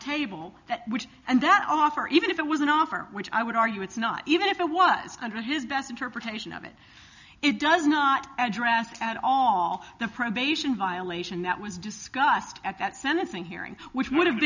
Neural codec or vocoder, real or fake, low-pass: none; real; 7.2 kHz